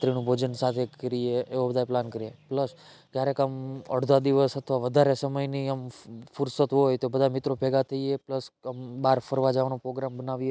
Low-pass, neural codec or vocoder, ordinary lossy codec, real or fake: none; none; none; real